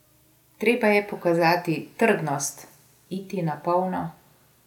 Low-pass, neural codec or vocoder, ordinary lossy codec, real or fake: 19.8 kHz; none; none; real